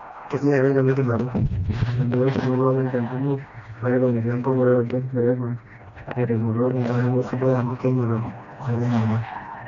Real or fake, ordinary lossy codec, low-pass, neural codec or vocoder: fake; none; 7.2 kHz; codec, 16 kHz, 1 kbps, FreqCodec, smaller model